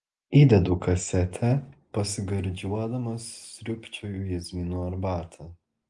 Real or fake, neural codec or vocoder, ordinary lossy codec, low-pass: real; none; Opus, 24 kbps; 9.9 kHz